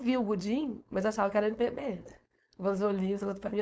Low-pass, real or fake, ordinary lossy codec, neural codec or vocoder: none; fake; none; codec, 16 kHz, 4.8 kbps, FACodec